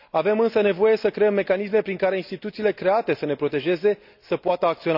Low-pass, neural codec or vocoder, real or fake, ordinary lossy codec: 5.4 kHz; none; real; none